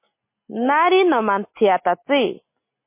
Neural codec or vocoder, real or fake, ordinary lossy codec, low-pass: none; real; MP3, 24 kbps; 3.6 kHz